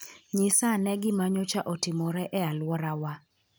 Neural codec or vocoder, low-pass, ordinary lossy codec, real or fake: none; none; none; real